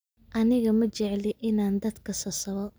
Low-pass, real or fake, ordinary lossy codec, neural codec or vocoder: none; real; none; none